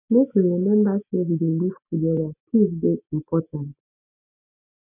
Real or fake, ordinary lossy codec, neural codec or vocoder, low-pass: real; none; none; 3.6 kHz